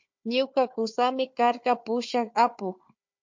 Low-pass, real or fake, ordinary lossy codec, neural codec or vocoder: 7.2 kHz; fake; MP3, 48 kbps; codec, 16 kHz, 4 kbps, FunCodec, trained on Chinese and English, 50 frames a second